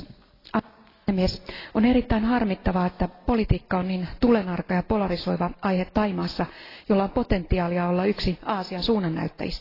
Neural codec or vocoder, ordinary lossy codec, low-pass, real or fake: none; AAC, 24 kbps; 5.4 kHz; real